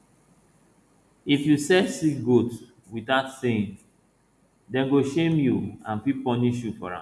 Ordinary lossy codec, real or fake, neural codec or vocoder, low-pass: none; real; none; none